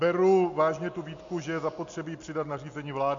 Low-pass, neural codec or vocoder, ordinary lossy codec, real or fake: 7.2 kHz; none; MP3, 48 kbps; real